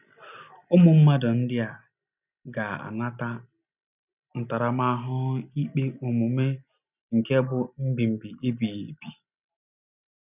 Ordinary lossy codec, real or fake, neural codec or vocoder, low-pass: none; real; none; 3.6 kHz